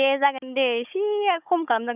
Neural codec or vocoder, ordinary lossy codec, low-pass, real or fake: codec, 16 kHz, 16 kbps, FunCodec, trained on Chinese and English, 50 frames a second; none; 3.6 kHz; fake